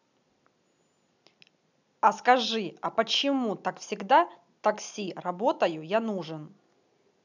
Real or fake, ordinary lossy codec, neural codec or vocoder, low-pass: real; none; none; 7.2 kHz